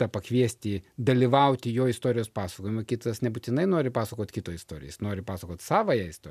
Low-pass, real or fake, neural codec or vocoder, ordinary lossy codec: 14.4 kHz; real; none; MP3, 96 kbps